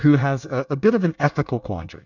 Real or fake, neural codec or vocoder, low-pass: fake; codec, 24 kHz, 1 kbps, SNAC; 7.2 kHz